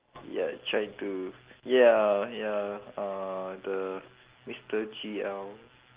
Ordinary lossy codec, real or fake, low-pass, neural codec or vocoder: Opus, 16 kbps; real; 3.6 kHz; none